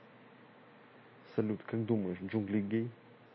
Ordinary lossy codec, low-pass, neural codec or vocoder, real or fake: MP3, 24 kbps; 7.2 kHz; none; real